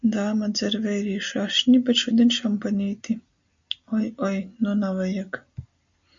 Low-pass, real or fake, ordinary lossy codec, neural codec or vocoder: 7.2 kHz; real; MP3, 48 kbps; none